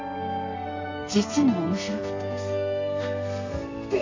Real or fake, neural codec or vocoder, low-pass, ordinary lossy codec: fake; codec, 32 kHz, 1.9 kbps, SNAC; 7.2 kHz; MP3, 64 kbps